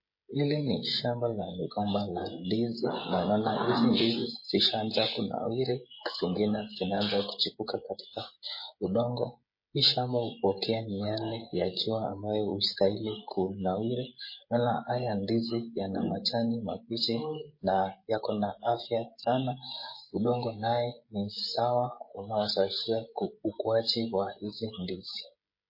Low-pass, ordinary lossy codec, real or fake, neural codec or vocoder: 5.4 kHz; MP3, 24 kbps; fake; codec, 16 kHz, 16 kbps, FreqCodec, smaller model